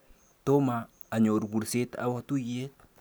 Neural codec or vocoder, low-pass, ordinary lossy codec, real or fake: none; none; none; real